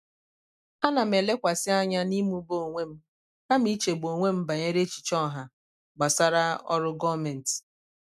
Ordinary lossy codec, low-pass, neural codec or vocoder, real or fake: none; 14.4 kHz; none; real